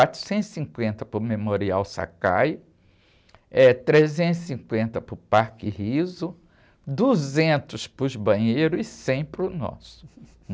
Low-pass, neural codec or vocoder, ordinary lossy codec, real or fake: none; none; none; real